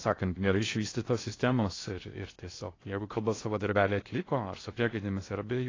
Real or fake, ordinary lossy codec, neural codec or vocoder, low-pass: fake; AAC, 32 kbps; codec, 16 kHz in and 24 kHz out, 0.6 kbps, FocalCodec, streaming, 2048 codes; 7.2 kHz